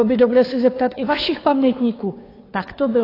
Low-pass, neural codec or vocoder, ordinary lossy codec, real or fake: 5.4 kHz; codec, 16 kHz, 2 kbps, FunCodec, trained on Chinese and English, 25 frames a second; AAC, 24 kbps; fake